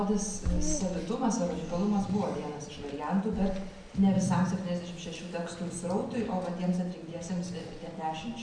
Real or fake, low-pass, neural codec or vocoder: real; 9.9 kHz; none